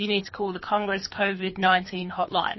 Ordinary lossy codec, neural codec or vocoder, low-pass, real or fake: MP3, 24 kbps; codec, 24 kHz, 3 kbps, HILCodec; 7.2 kHz; fake